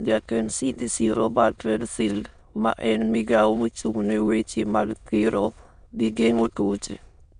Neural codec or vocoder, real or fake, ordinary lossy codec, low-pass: autoencoder, 22.05 kHz, a latent of 192 numbers a frame, VITS, trained on many speakers; fake; none; 9.9 kHz